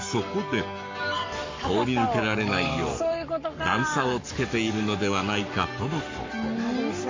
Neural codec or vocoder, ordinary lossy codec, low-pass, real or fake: codec, 44.1 kHz, 7.8 kbps, Pupu-Codec; MP3, 48 kbps; 7.2 kHz; fake